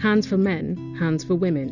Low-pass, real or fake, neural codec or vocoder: 7.2 kHz; real; none